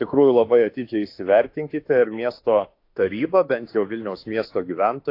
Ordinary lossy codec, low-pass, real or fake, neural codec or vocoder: AAC, 32 kbps; 5.4 kHz; fake; autoencoder, 48 kHz, 32 numbers a frame, DAC-VAE, trained on Japanese speech